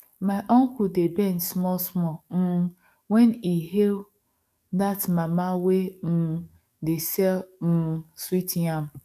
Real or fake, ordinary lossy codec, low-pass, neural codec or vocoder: fake; none; 14.4 kHz; codec, 44.1 kHz, 7.8 kbps, DAC